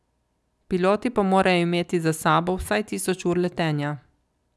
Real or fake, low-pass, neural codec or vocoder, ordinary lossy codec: real; none; none; none